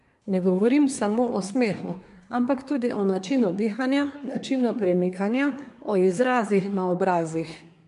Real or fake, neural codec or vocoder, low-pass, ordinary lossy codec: fake; codec, 24 kHz, 1 kbps, SNAC; 10.8 kHz; MP3, 64 kbps